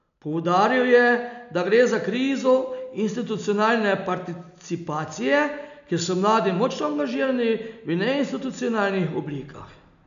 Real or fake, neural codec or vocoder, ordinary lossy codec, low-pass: real; none; none; 7.2 kHz